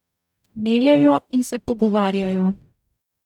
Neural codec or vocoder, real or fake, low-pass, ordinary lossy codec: codec, 44.1 kHz, 0.9 kbps, DAC; fake; 19.8 kHz; none